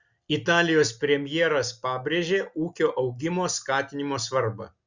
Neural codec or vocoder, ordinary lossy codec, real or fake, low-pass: none; Opus, 64 kbps; real; 7.2 kHz